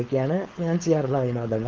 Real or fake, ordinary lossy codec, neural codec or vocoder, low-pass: fake; Opus, 16 kbps; codec, 16 kHz, 4.8 kbps, FACodec; 7.2 kHz